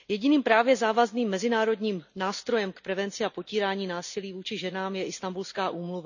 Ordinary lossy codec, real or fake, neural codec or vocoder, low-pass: none; real; none; 7.2 kHz